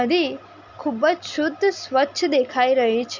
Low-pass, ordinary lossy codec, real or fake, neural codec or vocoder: 7.2 kHz; none; real; none